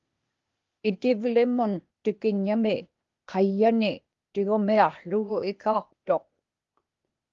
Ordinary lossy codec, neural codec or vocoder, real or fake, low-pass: Opus, 32 kbps; codec, 16 kHz, 0.8 kbps, ZipCodec; fake; 7.2 kHz